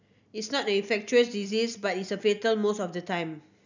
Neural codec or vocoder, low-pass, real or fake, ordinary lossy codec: none; 7.2 kHz; real; none